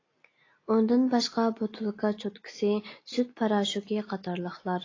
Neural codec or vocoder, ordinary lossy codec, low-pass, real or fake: none; AAC, 32 kbps; 7.2 kHz; real